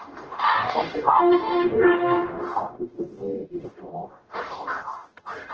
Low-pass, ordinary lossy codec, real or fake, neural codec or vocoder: 7.2 kHz; Opus, 16 kbps; fake; codec, 44.1 kHz, 0.9 kbps, DAC